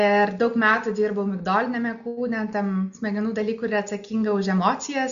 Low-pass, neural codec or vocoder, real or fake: 7.2 kHz; none; real